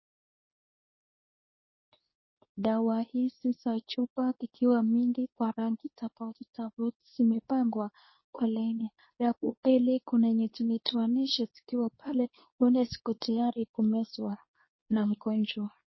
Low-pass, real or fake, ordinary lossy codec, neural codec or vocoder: 7.2 kHz; fake; MP3, 24 kbps; codec, 24 kHz, 0.9 kbps, WavTokenizer, medium speech release version 2